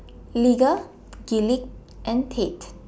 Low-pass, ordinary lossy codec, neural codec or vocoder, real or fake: none; none; none; real